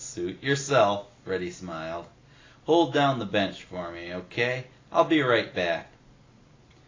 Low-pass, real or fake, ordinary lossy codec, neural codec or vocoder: 7.2 kHz; real; AAC, 32 kbps; none